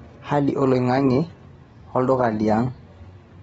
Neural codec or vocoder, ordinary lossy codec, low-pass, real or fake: none; AAC, 24 kbps; 10.8 kHz; real